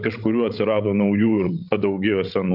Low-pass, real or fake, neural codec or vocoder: 5.4 kHz; fake; codec, 16 kHz, 16 kbps, FreqCodec, larger model